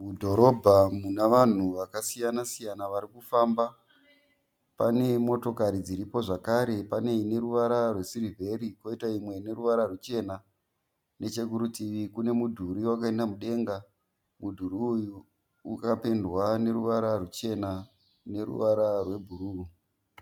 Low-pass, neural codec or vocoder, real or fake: 19.8 kHz; none; real